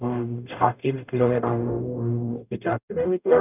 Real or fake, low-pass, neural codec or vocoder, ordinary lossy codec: fake; 3.6 kHz; codec, 44.1 kHz, 0.9 kbps, DAC; none